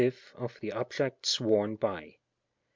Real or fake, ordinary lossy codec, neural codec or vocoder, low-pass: real; MP3, 64 kbps; none; 7.2 kHz